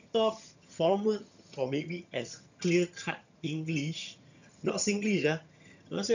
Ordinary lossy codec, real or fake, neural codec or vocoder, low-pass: none; fake; vocoder, 22.05 kHz, 80 mel bands, HiFi-GAN; 7.2 kHz